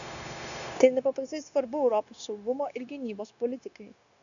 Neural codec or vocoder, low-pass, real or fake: codec, 16 kHz, 0.9 kbps, LongCat-Audio-Codec; 7.2 kHz; fake